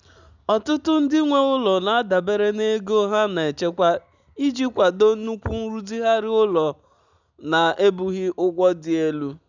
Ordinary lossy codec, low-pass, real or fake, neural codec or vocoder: none; 7.2 kHz; real; none